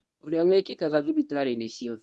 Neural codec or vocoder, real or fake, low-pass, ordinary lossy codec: codec, 24 kHz, 0.9 kbps, WavTokenizer, medium speech release version 1; fake; none; none